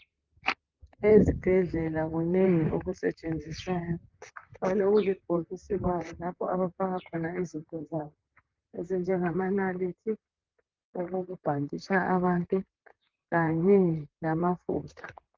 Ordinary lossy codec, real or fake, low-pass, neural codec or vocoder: Opus, 24 kbps; fake; 7.2 kHz; vocoder, 44.1 kHz, 128 mel bands, Pupu-Vocoder